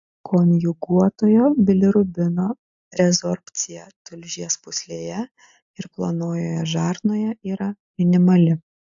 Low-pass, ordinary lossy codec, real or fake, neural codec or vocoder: 7.2 kHz; AAC, 64 kbps; real; none